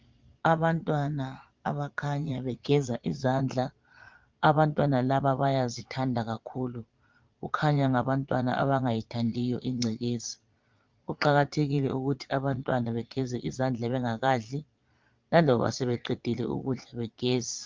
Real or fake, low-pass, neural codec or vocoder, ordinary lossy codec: fake; 7.2 kHz; vocoder, 22.05 kHz, 80 mel bands, Vocos; Opus, 32 kbps